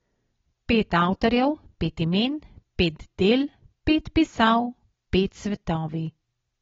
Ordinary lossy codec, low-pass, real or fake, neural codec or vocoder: AAC, 24 kbps; 7.2 kHz; real; none